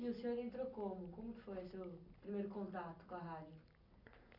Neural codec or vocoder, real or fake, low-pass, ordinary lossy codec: none; real; 5.4 kHz; none